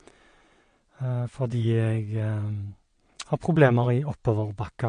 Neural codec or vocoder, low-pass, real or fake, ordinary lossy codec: vocoder, 22.05 kHz, 80 mel bands, WaveNeXt; 9.9 kHz; fake; MP3, 48 kbps